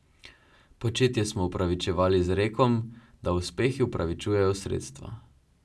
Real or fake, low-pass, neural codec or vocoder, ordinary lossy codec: real; none; none; none